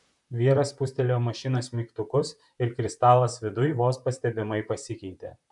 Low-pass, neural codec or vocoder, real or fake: 10.8 kHz; vocoder, 44.1 kHz, 128 mel bands, Pupu-Vocoder; fake